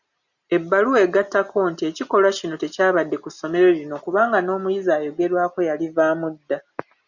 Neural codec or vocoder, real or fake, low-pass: none; real; 7.2 kHz